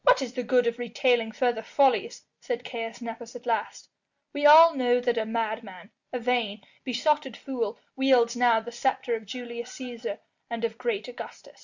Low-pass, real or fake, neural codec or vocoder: 7.2 kHz; real; none